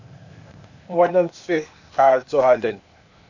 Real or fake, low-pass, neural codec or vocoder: fake; 7.2 kHz; codec, 16 kHz, 0.8 kbps, ZipCodec